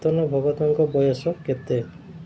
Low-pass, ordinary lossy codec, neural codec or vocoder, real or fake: none; none; none; real